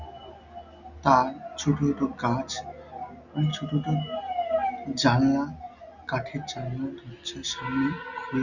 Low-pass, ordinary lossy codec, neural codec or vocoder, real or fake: 7.2 kHz; none; none; real